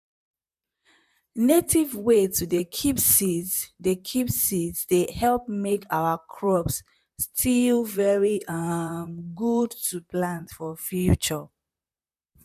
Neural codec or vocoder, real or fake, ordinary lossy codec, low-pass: vocoder, 44.1 kHz, 128 mel bands, Pupu-Vocoder; fake; none; 14.4 kHz